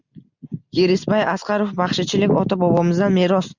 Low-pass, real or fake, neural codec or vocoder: 7.2 kHz; real; none